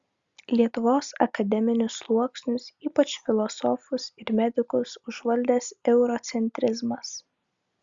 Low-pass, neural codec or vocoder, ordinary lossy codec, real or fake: 7.2 kHz; none; Opus, 64 kbps; real